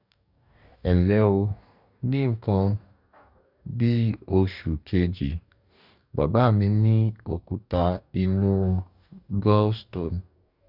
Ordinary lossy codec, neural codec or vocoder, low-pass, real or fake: none; codec, 44.1 kHz, 2.6 kbps, DAC; 5.4 kHz; fake